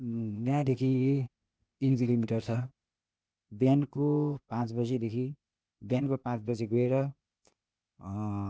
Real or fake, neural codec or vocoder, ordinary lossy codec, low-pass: fake; codec, 16 kHz, 0.8 kbps, ZipCodec; none; none